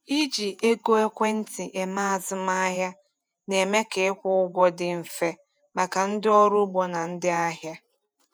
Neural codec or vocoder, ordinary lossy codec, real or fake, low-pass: vocoder, 48 kHz, 128 mel bands, Vocos; none; fake; none